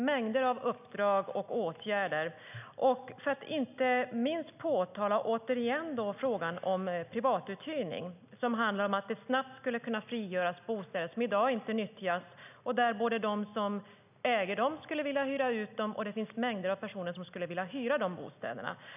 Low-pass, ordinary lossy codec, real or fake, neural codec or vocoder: 3.6 kHz; none; real; none